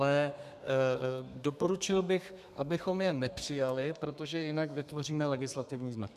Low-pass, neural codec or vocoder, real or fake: 14.4 kHz; codec, 32 kHz, 1.9 kbps, SNAC; fake